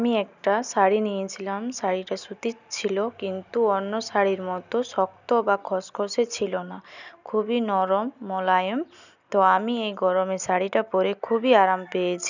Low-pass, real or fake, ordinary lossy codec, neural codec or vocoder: 7.2 kHz; real; none; none